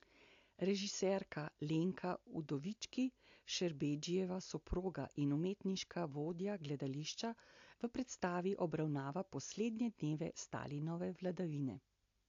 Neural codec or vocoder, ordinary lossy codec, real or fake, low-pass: none; AAC, 48 kbps; real; 7.2 kHz